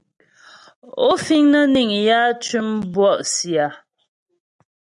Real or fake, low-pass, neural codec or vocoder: real; 10.8 kHz; none